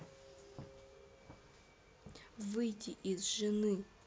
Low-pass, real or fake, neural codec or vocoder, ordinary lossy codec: none; real; none; none